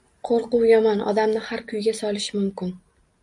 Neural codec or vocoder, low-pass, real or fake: none; 10.8 kHz; real